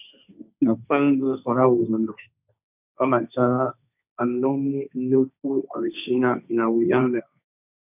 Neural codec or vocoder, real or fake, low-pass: codec, 16 kHz, 1.1 kbps, Voila-Tokenizer; fake; 3.6 kHz